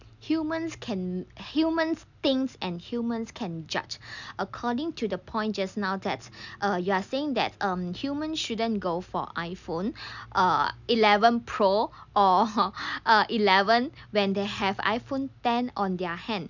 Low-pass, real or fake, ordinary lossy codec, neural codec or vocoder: 7.2 kHz; real; none; none